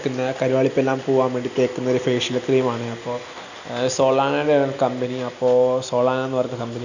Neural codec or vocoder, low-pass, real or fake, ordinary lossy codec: none; 7.2 kHz; real; none